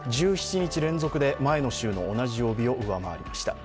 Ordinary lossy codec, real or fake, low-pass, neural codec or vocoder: none; real; none; none